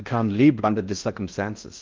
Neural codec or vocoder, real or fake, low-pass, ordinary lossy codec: codec, 16 kHz in and 24 kHz out, 0.6 kbps, FocalCodec, streaming, 4096 codes; fake; 7.2 kHz; Opus, 32 kbps